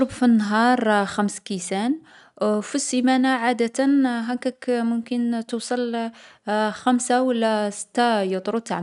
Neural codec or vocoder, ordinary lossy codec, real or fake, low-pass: none; none; real; 10.8 kHz